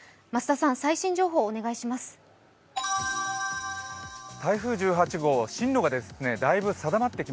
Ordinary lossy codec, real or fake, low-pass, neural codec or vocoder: none; real; none; none